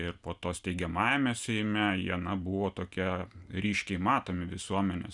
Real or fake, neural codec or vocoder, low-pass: real; none; 10.8 kHz